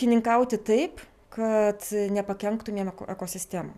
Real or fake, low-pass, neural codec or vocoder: real; 14.4 kHz; none